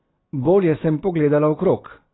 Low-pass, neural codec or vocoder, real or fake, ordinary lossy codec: 7.2 kHz; none; real; AAC, 16 kbps